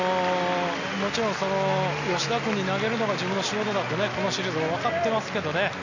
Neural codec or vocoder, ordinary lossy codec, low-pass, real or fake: none; none; 7.2 kHz; real